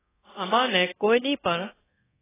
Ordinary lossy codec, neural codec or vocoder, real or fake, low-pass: AAC, 16 kbps; codec, 24 kHz, 0.9 kbps, DualCodec; fake; 3.6 kHz